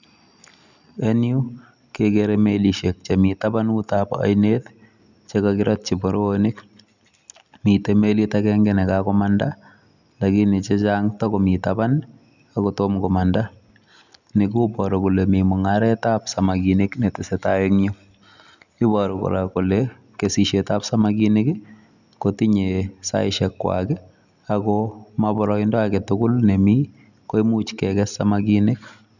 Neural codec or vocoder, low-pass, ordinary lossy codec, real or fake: none; 7.2 kHz; none; real